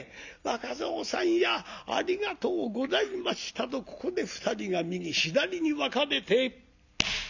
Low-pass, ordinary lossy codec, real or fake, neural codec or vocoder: 7.2 kHz; none; real; none